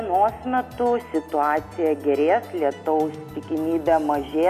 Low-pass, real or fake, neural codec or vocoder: 14.4 kHz; real; none